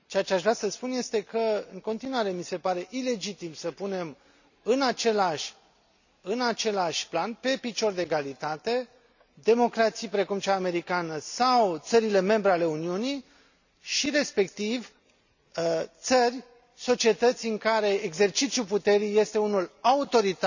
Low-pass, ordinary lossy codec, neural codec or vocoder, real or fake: 7.2 kHz; none; none; real